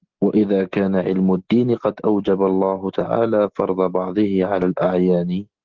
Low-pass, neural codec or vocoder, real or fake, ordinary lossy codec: 7.2 kHz; none; real; Opus, 16 kbps